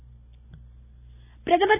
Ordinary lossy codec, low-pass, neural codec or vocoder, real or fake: none; 3.6 kHz; none; real